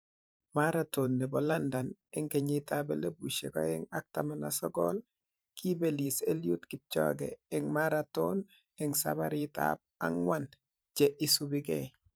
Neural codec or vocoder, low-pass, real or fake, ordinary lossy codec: vocoder, 44.1 kHz, 128 mel bands every 512 samples, BigVGAN v2; none; fake; none